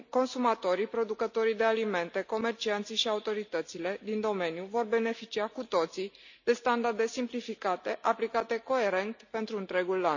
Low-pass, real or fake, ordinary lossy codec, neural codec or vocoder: 7.2 kHz; real; none; none